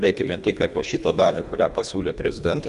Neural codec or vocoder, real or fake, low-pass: codec, 24 kHz, 1.5 kbps, HILCodec; fake; 10.8 kHz